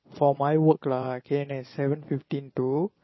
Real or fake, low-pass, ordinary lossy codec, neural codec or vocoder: fake; 7.2 kHz; MP3, 24 kbps; vocoder, 22.05 kHz, 80 mel bands, Vocos